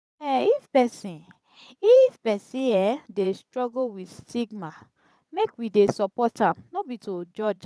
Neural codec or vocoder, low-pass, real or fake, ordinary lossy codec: vocoder, 22.05 kHz, 80 mel bands, Vocos; none; fake; none